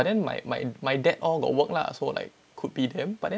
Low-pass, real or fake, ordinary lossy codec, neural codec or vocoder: none; real; none; none